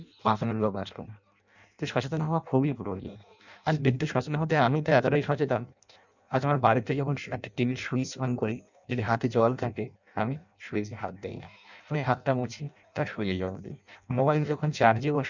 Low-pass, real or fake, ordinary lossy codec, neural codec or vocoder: 7.2 kHz; fake; none; codec, 16 kHz in and 24 kHz out, 0.6 kbps, FireRedTTS-2 codec